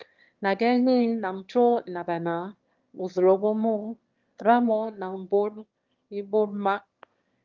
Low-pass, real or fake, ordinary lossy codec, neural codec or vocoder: 7.2 kHz; fake; Opus, 24 kbps; autoencoder, 22.05 kHz, a latent of 192 numbers a frame, VITS, trained on one speaker